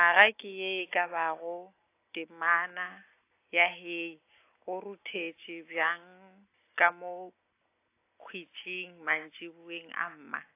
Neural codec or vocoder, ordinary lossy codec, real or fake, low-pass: none; AAC, 24 kbps; real; 3.6 kHz